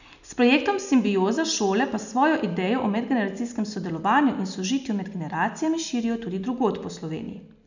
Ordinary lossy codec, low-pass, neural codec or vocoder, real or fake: none; 7.2 kHz; none; real